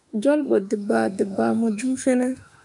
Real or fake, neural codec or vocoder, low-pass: fake; autoencoder, 48 kHz, 32 numbers a frame, DAC-VAE, trained on Japanese speech; 10.8 kHz